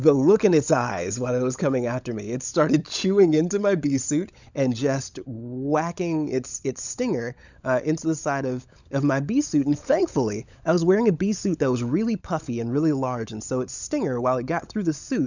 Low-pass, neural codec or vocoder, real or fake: 7.2 kHz; codec, 16 kHz, 16 kbps, FunCodec, trained on LibriTTS, 50 frames a second; fake